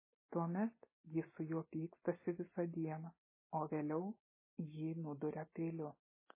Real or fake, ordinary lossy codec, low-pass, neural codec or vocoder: real; MP3, 16 kbps; 3.6 kHz; none